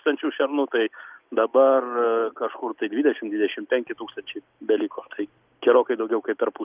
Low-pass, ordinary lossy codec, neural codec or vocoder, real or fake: 3.6 kHz; Opus, 24 kbps; none; real